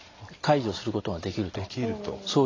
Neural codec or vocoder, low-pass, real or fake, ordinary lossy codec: none; 7.2 kHz; real; AAC, 32 kbps